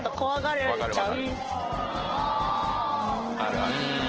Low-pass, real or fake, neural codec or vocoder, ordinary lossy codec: 7.2 kHz; real; none; Opus, 16 kbps